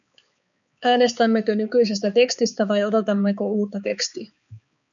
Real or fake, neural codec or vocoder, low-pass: fake; codec, 16 kHz, 4 kbps, X-Codec, HuBERT features, trained on balanced general audio; 7.2 kHz